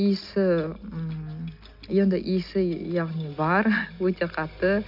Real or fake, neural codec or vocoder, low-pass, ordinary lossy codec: real; none; 5.4 kHz; none